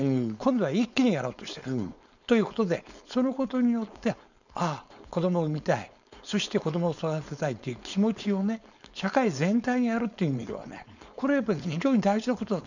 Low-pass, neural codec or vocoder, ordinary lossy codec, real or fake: 7.2 kHz; codec, 16 kHz, 4.8 kbps, FACodec; none; fake